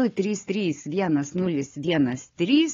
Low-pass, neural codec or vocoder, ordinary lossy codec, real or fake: 7.2 kHz; codec, 16 kHz, 4 kbps, FunCodec, trained on Chinese and English, 50 frames a second; AAC, 32 kbps; fake